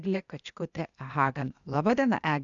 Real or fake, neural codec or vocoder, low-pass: fake; codec, 16 kHz, 0.8 kbps, ZipCodec; 7.2 kHz